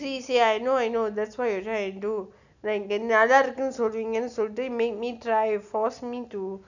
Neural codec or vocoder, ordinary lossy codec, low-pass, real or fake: none; none; 7.2 kHz; real